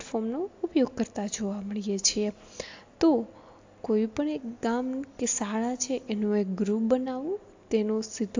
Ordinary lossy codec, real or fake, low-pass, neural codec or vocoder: AAC, 48 kbps; real; 7.2 kHz; none